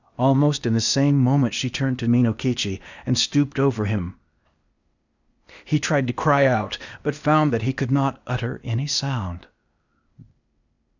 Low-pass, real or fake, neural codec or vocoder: 7.2 kHz; fake; codec, 16 kHz, 0.8 kbps, ZipCodec